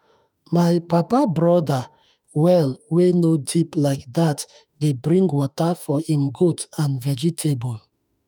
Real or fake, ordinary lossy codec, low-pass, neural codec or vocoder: fake; none; none; autoencoder, 48 kHz, 32 numbers a frame, DAC-VAE, trained on Japanese speech